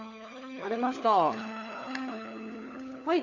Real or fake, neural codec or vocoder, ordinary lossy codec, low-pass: fake; codec, 16 kHz, 4 kbps, FunCodec, trained on LibriTTS, 50 frames a second; none; 7.2 kHz